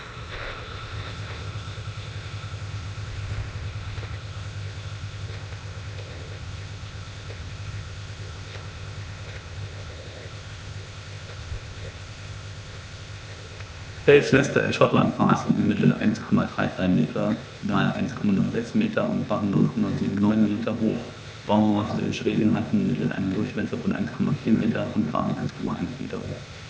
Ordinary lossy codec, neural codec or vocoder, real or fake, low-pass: none; codec, 16 kHz, 0.8 kbps, ZipCodec; fake; none